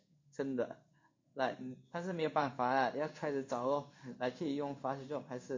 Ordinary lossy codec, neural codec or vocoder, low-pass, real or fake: MP3, 48 kbps; codec, 16 kHz in and 24 kHz out, 1 kbps, XY-Tokenizer; 7.2 kHz; fake